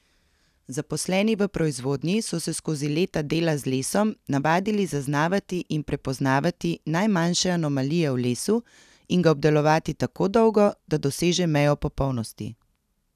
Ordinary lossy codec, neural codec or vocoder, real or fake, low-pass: none; none; real; 14.4 kHz